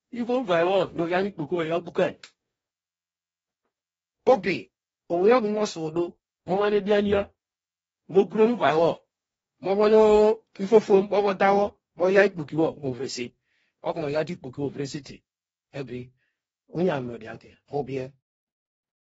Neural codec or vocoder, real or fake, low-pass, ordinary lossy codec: codec, 44.1 kHz, 2.6 kbps, DAC; fake; 19.8 kHz; AAC, 24 kbps